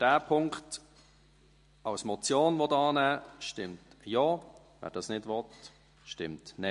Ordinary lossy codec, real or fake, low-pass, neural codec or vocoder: MP3, 48 kbps; real; 10.8 kHz; none